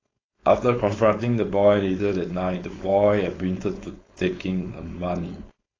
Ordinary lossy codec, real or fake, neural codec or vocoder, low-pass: AAC, 32 kbps; fake; codec, 16 kHz, 4.8 kbps, FACodec; 7.2 kHz